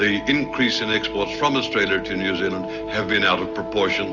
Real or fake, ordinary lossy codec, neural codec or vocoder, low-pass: real; Opus, 32 kbps; none; 7.2 kHz